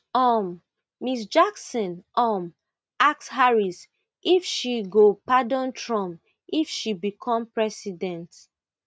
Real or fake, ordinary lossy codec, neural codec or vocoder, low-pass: real; none; none; none